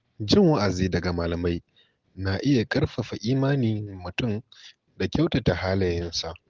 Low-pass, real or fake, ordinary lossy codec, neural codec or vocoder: 7.2 kHz; real; Opus, 16 kbps; none